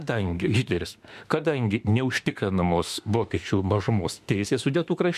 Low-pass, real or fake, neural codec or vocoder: 14.4 kHz; fake; autoencoder, 48 kHz, 32 numbers a frame, DAC-VAE, trained on Japanese speech